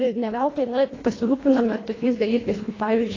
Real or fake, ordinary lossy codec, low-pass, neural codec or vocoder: fake; AAC, 32 kbps; 7.2 kHz; codec, 24 kHz, 1.5 kbps, HILCodec